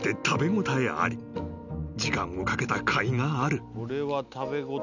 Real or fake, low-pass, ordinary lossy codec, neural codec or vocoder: real; 7.2 kHz; none; none